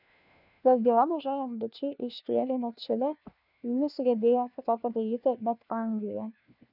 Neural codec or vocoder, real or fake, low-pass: codec, 16 kHz, 1 kbps, FunCodec, trained on LibriTTS, 50 frames a second; fake; 5.4 kHz